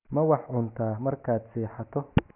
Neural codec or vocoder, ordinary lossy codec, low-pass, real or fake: none; none; 3.6 kHz; real